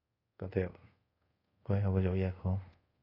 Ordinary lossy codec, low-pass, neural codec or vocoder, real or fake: AAC, 24 kbps; 5.4 kHz; codec, 24 kHz, 0.5 kbps, DualCodec; fake